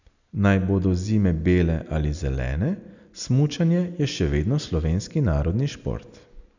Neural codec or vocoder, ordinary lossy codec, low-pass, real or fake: none; none; 7.2 kHz; real